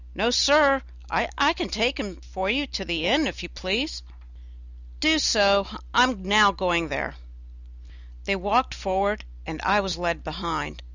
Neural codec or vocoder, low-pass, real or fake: none; 7.2 kHz; real